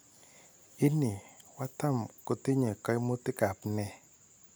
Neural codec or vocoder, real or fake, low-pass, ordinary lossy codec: none; real; none; none